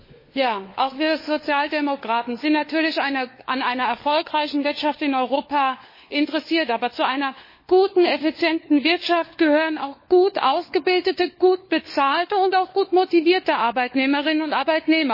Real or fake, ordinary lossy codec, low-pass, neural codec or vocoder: fake; MP3, 24 kbps; 5.4 kHz; codec, 16 kHz, 4 kbps, FunCodec, trained on LibriTTS, 50 frames a second